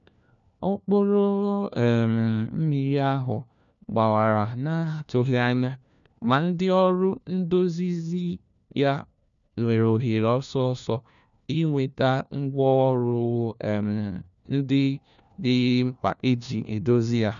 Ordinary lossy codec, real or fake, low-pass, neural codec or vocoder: none; fake; 7.2 kHz; codec, 16 kHz, 1 kbps, FunCodec, trained on LibriTTS, 50 frames a second